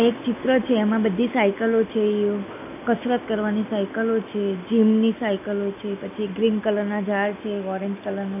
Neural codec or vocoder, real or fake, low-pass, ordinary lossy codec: none; real; 3.6 kHz; none